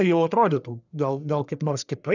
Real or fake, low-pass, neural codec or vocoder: fake; 7.2 kHz; codec, 44.1 kHz, 1.7 kbps, Pupu-Codec